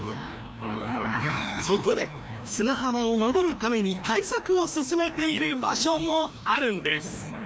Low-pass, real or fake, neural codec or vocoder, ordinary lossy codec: none; fake; codec, 16 kHz, 1 kbps, FreqCodec, larger model; none